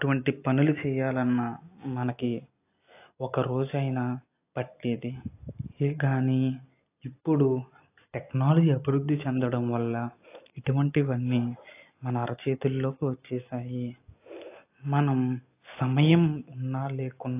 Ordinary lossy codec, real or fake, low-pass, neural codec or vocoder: AAC, 24 kbps; real; 3.6 kHz; none